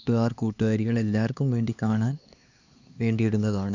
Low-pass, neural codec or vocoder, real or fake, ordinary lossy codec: 7.2 kHz; codec, 16 kHz, 2 kbps, X-Codec, HuBERT features, trained on LibriSpeech; fake; none